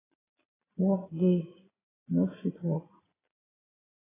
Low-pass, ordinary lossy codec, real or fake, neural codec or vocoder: 3.6 kHz; AAC, 16 kbps; fake; vocoder, 22.05 kHz, 80 mel bands, Vocos